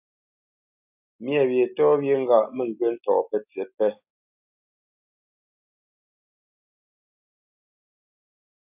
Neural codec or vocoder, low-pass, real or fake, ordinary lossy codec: none; 3.6 kHz; real; AAC, 32 kbps